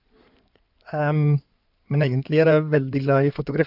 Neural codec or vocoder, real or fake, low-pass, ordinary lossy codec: codec, 16 kHz in and 24 kHz out, 2.2 kbps, FireRedTTS-2 codec; fake; 5.4 kHz; none